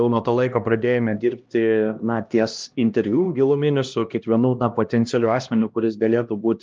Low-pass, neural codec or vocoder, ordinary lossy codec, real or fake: 7.2 kHz; codec, 16 kHz, 1 kbps, X-Codec, HuBERT features, trained on LibriSpeech; Opus, 24 kbps; fake